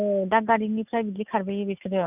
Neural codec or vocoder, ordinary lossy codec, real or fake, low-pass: none; none; real; 3.6 kHz